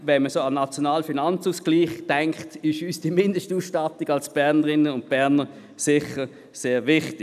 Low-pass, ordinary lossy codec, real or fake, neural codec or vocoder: 14.4 kHz; none; real; none